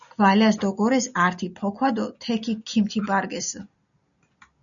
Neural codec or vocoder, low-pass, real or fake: none; 7.2 kHz; real